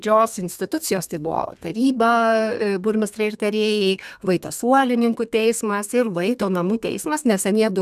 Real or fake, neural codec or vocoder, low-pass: fake; codec, 32 kHz, 1.9 kbps, SNAC; 14.4 kHz